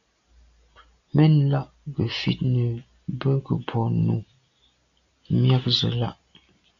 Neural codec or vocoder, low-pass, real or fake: none; 7.2 kHz; real